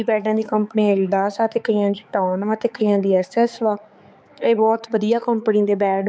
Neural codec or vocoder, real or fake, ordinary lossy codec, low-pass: codec, 16 kHz, 4 kbps, X-Codec, HuBERT features, trained on balanced general audio; fake; none; none